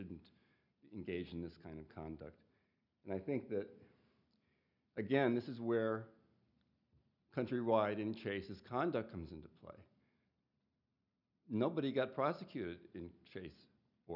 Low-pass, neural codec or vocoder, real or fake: 5.4 kHz; none; real